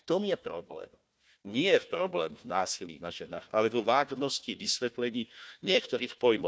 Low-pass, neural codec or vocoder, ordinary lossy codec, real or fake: none; codec, 16 kHz, 1 kbps, FunCodec, trained on Chinese and English, 50 frames a second; none; fake